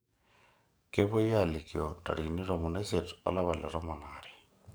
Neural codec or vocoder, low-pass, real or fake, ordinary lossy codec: codec, 44.1 kHz, 7.8 kbps, DAC; none; fake; none